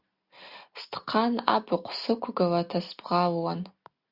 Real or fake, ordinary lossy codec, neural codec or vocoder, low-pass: real; Opus, 64 kbps; none; 5.4 kHz